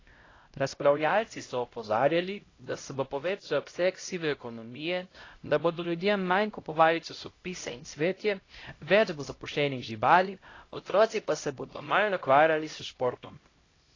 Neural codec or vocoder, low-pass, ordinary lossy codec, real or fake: codec, 16 kHz, 0.5 kbps, X-Codec, HuBERT features, trained on LibriSpeech; 7.2 kHz; AAC, 32 kbps; fake